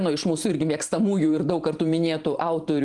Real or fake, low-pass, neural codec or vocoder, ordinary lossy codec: fake; 10.8 kHz; vocoder, 44.1 kHz, 128 mel bands every 512 samples, BigVGAN v2; Opus, 24 kbps